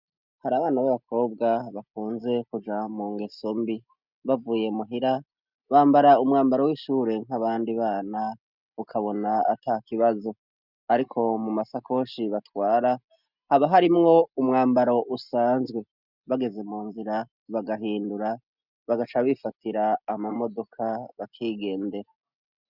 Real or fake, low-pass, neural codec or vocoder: real; 5.4 kHz; none